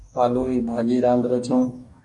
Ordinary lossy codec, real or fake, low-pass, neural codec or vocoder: Opus, 64 kbps; fake; 10.8 kHz; codec, 44.1 kHz, 2.6 kbps, DAC